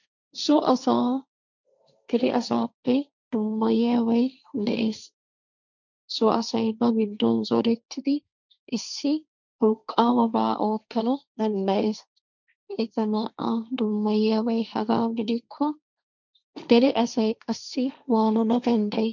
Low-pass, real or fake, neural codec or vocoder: 7.2 kHz; fake; codec, 16 kHz, 1.1 kbps, Voila-Tokenizer